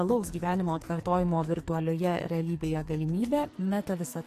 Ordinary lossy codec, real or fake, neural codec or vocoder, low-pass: AAC, 64 kbps; fake; codec, 44.1 kHz, 2.6 kbps, SNAC; 14.4 kHz